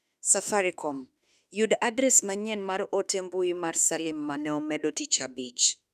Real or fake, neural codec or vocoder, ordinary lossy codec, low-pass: fake; autoencoder, 48 kHz, 32 numbers a frame, DAC-VAE, trained on Japanese speech; none; 14.4 kHz